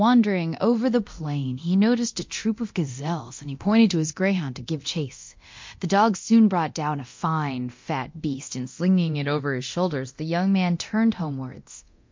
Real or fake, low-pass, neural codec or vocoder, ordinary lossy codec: fake; 7.2 kHz; codec, 24 kHz, 0.9 kbps, DualCodec; MP3, 48 kbps